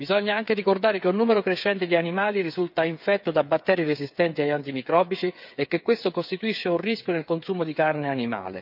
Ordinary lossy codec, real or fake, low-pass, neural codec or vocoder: none; fake; 5.4 kHz; codec, 16 kHz, 8 kbps, FreqCodec, smaller model